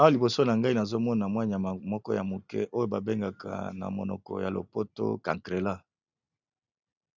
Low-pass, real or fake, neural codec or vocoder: 7.2 kHz; real; none